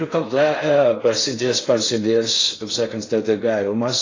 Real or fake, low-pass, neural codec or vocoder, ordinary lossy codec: fake; 7.2 kHz; codec, 16 kHz in and 24 kHz out, 0.6 kbps, FocalCodec, streaming, 4096 codes; AAC, 32 kbps